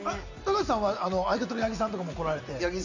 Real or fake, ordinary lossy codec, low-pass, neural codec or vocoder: real; none; 7.2 kHz; none